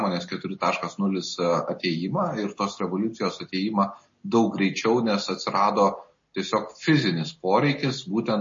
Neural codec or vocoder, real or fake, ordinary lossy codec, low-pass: none; real; MP3, 32 kbps; 7.2 kHz